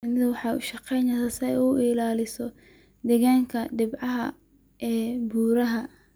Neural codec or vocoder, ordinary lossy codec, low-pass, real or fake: none; none; none; real